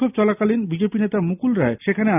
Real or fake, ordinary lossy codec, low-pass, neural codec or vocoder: real; none; 3.6 kHz; none